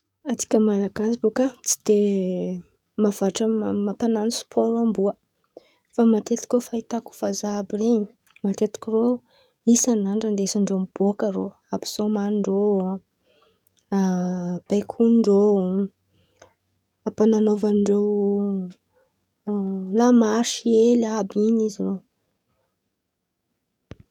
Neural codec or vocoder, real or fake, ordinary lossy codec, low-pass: codec, 44.1 kHz, 7.8 kbps, DAC; fake; none; 19.8 kHz